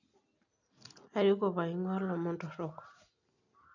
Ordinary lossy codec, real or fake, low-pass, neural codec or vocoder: none; real; 7.2 kHz; none